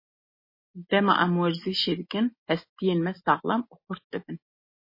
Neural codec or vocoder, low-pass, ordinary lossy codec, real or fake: none; 5.4 kHz; MP3, 24 kbps; real